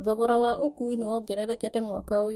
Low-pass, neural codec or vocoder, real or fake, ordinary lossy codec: 19.8 kHz; codec, 44.1 kHz, 2.6 kbps, DAC; fake; MP3, 64 kbps